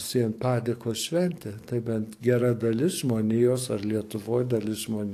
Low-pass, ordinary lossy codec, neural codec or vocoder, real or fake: 14.4 kHz; AAC, 64 kbps; codec, 44.1 kHz, 7.8 kbps, DAC; fake